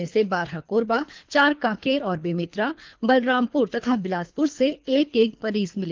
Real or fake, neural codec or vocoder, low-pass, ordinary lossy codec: fake; codec, 24 kHz, 3 kbps, HILCodec; 7.2 kHz; Opus, 24 kbps